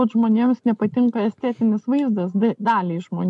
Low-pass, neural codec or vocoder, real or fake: 10.8 kHz; none; real